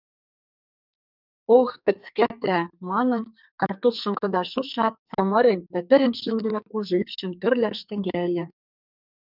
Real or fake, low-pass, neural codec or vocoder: fake; 5.4 kHz; codec, 44.1 kHz, 2.6 kbps, SNAC